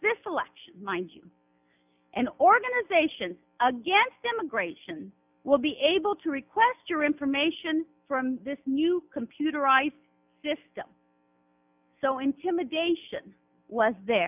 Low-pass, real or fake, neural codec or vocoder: 3.6 kHz; real; none